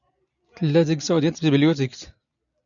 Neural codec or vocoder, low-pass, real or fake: none; 7.2 kHz; real